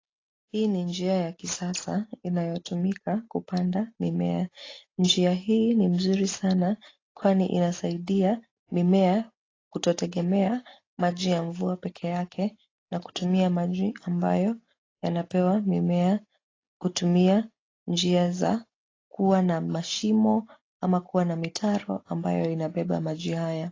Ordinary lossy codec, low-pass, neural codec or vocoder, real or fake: AAC, 32 kbps; 7.2 kHz; none; real